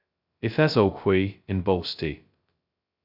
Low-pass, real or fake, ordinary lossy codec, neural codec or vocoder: 5.4 kHz; fake; AAC, 48 kbps; codec, 16 kHz, 0.2 kbps, FocalCodec